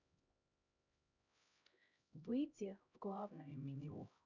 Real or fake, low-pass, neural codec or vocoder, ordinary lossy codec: fake; 7.2 kHz; codec, 16 kHz, 0.5 kbps, X-Codec, HuBERT features, trained on LibriSpeech; none